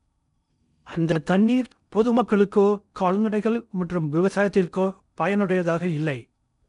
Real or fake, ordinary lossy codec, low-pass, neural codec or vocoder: fake; none; 10.8 kHz; codec, 16 kHz in and 24 kHz out, 0.6 kbps, FocalCodec, streaming, 4096 codes